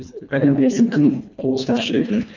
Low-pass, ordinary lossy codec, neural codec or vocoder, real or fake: 7.2 kHz; none; codec, 24 kHz, 1.5 kbps, HILCodec; fake